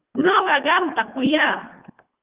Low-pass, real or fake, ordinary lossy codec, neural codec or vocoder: 3.6 kHz; fake; Opus, 32 kbps; codec, 24 kHz, 1.5 kbps, HILCodec